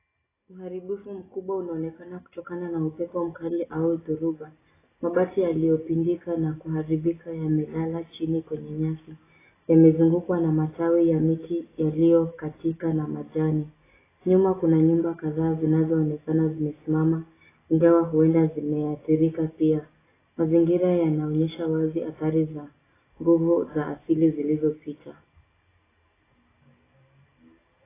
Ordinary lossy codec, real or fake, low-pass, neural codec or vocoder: AAC, 16 kbps; real; 3.6 kHz; none